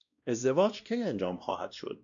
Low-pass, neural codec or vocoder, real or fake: 7.2 kHz; codec, 16 kHz, 1 kbps, X-Codec, WavLM features, trained on Multilingual LibriSpeech; fake